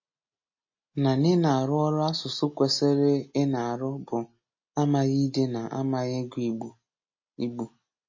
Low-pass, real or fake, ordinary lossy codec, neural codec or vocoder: 7.2 kHz; real; MP3, 32 kbps; none